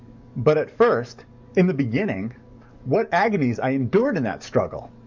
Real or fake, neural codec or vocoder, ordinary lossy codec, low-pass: fake; codec, 44.1 kHz, 7.8 kbps, DAC; MP3, 64 kbps; 7.2 kHz